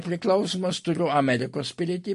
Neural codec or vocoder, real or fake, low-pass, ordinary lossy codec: none; real; 14.4 kHz; MP3, 48 kbps